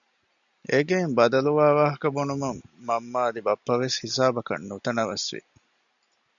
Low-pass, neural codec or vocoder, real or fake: 7.2 kHz; none; real